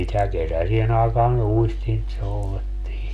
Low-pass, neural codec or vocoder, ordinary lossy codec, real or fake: 14.4 kHz; none; none; real